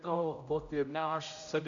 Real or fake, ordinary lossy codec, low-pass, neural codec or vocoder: fake; AAC, 64 kbps; 7.2 kHz; codec, 16 kHz, 0.5 kbps, X-Codec, HuBERT features, trained on general audio